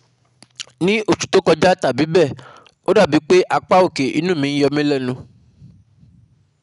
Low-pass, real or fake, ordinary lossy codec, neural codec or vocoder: 10.8 kHz; real; none; none